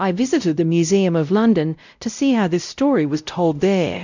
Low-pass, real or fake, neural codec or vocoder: 7.2 kHz; fake; codec, 16 kHz, 0.5 kbps, X-Codec, WavLM features, trained on Multilingual LibriSpeech